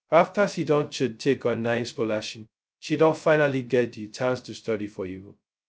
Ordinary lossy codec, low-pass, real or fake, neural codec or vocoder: none; none; fake; codec, 16 kHz, 0.2 kbps, FocalCodec